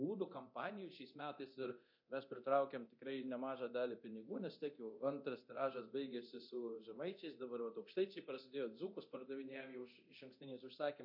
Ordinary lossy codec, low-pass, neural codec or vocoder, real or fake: MP3, 32 kbps; 5.4 kHz; codec, 24 kHz, 0.9 kbps, DualCodec; fake